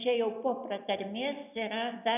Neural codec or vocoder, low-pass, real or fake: none; 3.6 kHz; real